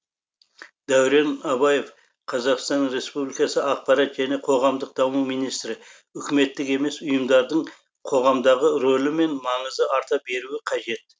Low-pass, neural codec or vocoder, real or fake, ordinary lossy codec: none; none; real; none